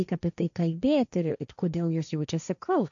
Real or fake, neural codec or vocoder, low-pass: fake; codec, 16 kHz, 1.1 kbps, Voila-Tokenizer; 7.2 kHz